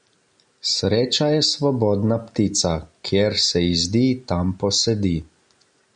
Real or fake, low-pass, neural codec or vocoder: real; 9.9 kHz; none